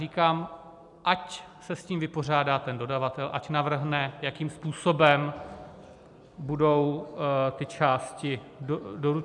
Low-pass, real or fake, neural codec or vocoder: 10.8 kHz; real; none